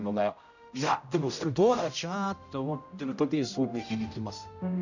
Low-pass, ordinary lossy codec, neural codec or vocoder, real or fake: 7.2 kHz; none; codec, 16 kHz, 0.5 kbps, X-Codec, HuBERT features, trained on general audio; fake